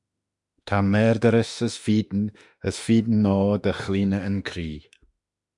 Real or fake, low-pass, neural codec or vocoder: fake; 10.8 kHz; autoencoder, 48 kHz, 32 numbers a frame, DAC-VAE, trained on Japanese speech